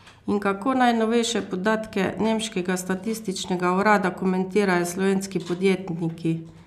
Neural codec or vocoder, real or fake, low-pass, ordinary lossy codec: none; real; 14.4 kHz; none